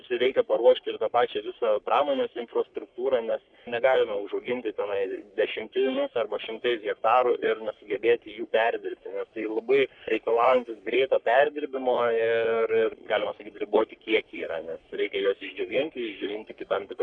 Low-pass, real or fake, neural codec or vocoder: 9.9 kHz; fake; codec, 44.1 kHz, 3.4 kbps, Pupu-Codec